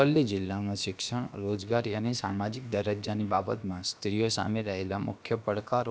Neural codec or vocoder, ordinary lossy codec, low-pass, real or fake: codec, 16 kHz, about 1 kbps, DyCAST, with the encoder's durations; none; none; fake